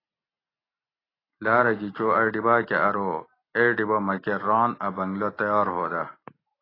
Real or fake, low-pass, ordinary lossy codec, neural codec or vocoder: real; 5.4 kHz; AAC, 24 kbps; none